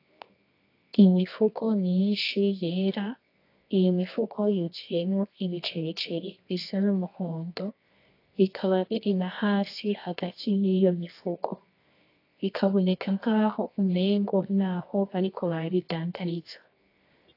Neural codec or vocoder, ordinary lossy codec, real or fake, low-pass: codec, 24 kHz, 0.9 kbps, WavTokenizer, medium music audio release; AAC, 32 kbps; fake; 5.4 kHz